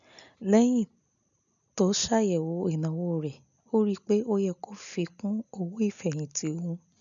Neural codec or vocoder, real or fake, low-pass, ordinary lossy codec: none; real; 7.2 kHz; none